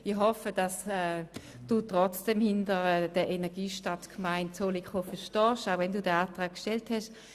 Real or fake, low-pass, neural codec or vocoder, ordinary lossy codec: real; 14.4 kHz; none; Opus, 64 kbps